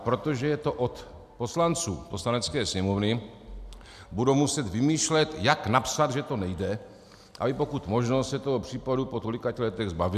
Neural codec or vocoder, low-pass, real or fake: none; 14.4 kHz; real